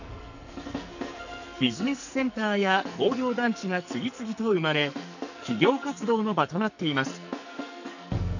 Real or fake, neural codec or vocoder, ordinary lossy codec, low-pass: fake; codec, 44.1 kHz, 2.6 kbps, SNAC; none; 7.2 kHz